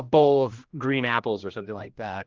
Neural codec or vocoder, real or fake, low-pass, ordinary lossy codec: codec, 16 kHz, 1 kbps, X-Codec, HuBERT features, trained on general audio; fake; 7.2 kHz; Opus, 32 kbps